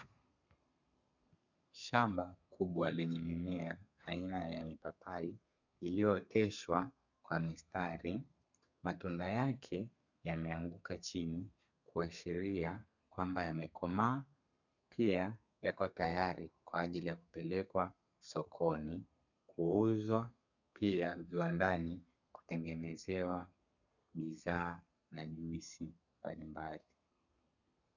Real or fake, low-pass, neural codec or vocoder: fake; 7.2 kHz; codec, 32 kHz, 1.9 kbps, SNAC